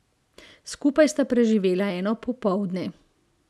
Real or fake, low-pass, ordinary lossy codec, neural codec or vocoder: real; none; none; none